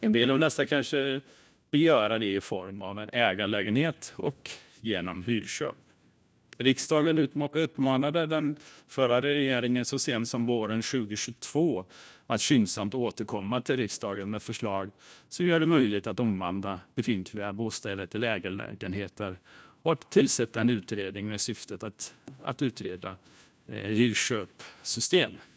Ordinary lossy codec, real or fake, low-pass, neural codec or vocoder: none; fake; none; codec, 16 kHz, 1 kbps, FunCodec, trained on LibriTTS, 50 frames a second